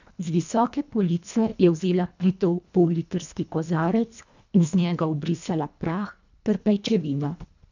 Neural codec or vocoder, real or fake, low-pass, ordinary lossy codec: codec, 24 kHz, 1.5 kbps, HILCodec; fake; 7.2 kHz; none